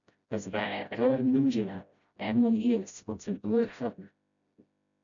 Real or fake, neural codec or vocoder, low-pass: fake; codec, 16 kHz, 0.5 kbps, FreqCodec, smaller model; 7.2 kHz